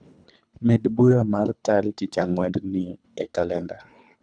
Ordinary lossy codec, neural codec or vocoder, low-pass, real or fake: none; codec, 24 kHz, 3 kbps, HILCodec; 9.9 kHz; fake